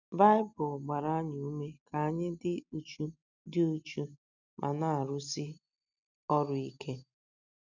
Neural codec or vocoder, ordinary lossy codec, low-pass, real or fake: none; none; 7.2 kHz; real